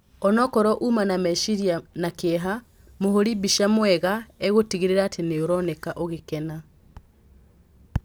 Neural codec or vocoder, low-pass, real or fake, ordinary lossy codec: none; none; real; none